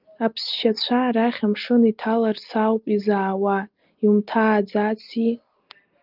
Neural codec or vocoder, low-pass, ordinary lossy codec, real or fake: none; 5.4 kHz; Opus, 32 kbps; real